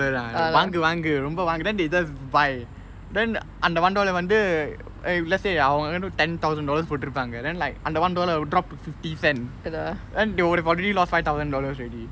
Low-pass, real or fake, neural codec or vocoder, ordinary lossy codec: none; real; none; none